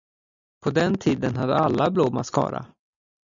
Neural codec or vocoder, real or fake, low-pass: none; real; 7.2 kHz